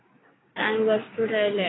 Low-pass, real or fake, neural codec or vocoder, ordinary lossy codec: 7.2 kHz; fake; codec, 16 kHz, 6 kbps, DAC; AAC, 16 kbps